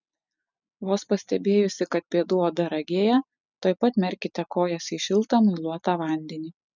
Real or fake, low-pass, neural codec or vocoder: real; 7.2 kHz; none